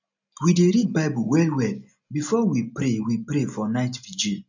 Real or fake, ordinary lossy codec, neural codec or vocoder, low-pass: real; none; none; 7.2 kHz